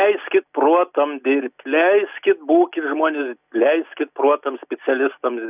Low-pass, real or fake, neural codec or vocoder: 3.6 kHz; real; none